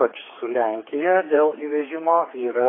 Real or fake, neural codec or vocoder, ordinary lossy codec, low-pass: fake; codec, 16 kHz, 4 kbps, X-Codec, HuBERT features, trained on general audio; AAC, 16 kbps; 7.2 kHz